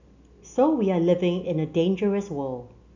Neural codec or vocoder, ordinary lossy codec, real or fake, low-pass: none; none; real; 7.2 kHz